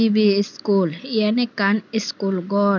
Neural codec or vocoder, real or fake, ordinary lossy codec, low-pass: none; real; none; 7.2 kHz